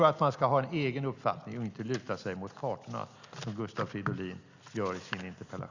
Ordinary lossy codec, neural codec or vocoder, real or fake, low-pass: Opus, 64 kbps; none; real; 7.2 kHz